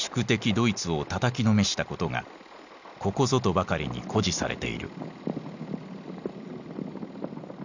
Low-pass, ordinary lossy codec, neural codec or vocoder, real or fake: 7.2 kHz; none; none; real